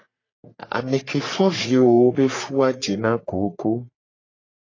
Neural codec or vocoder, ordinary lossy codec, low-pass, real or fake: codec, 44.1 kHz, 3.4 kbps, Pupu-Codec; AAC, 32 kbps; 7.2 kHz; fake